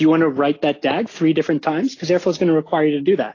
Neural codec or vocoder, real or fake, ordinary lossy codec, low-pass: none; real; AAC, 32 kbps; 7.2 kHz